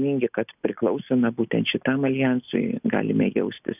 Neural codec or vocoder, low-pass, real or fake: none; 3.6 kHz; real